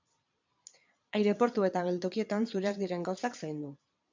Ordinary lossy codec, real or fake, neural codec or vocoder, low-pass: MP3, 64 kbps; fake; vocoder, 22.05 kHz, 80 mel bands, Vocos; 7.2 kHz